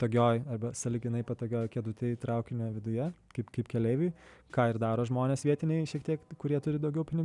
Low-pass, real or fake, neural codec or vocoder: 10.8 kHz; real; none